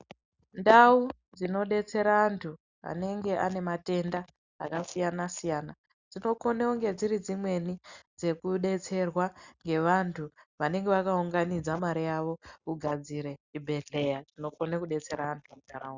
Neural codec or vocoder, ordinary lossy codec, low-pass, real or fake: none; Opus, 64 kbps; 7.2 kHz; real